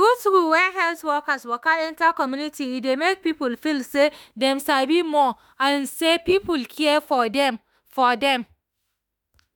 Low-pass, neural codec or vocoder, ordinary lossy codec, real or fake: none; autoencoder, 48 kHz, 32 numbers a frame, DAC-VAE, trained on Japanese speech; none; fake